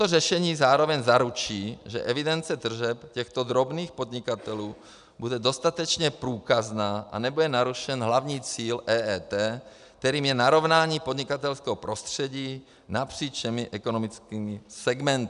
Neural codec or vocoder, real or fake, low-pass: none; real; 10.8 kHz